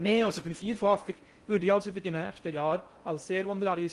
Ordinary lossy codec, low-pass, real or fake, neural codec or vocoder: Opus, 24 kbps; 10.8 kHz; fake; codec, 16 kHz in and 24 kHz out, 0.6 kbps, FocalCodec, streaming, 4096 codes